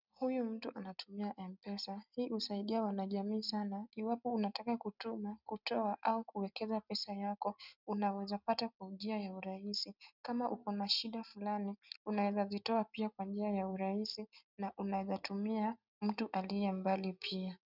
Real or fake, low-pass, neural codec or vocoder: real; 5.4 kHz; none